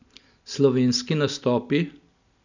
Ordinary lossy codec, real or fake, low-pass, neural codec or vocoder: none; real; 7.2 kHz; none